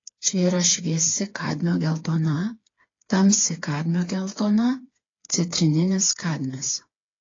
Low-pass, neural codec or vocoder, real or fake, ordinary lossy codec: 7.2 kHz; codec, 16 kHz, 4 kbps, FreqCodec, smaller model; fake; AAC, 32 kbps